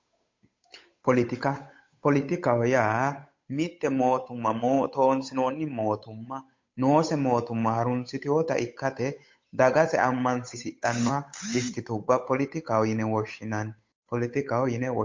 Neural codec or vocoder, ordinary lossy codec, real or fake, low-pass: codec, 16 kHz, 8 kbps, FunCodec, trained on Chinese and English, 25 frames a second; MP3, 48 kbps; fake; 7.2 kHz